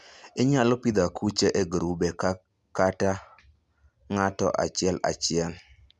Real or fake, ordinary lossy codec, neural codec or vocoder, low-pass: real; none; none; none